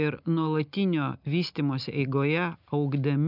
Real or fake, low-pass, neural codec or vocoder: real; 5.4 kHz; none